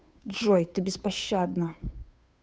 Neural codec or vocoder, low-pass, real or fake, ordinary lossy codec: codec, 16 kHz, 2 kbps, FunCodec, trained on Chinese and English, 25 frames a second; none; fake; none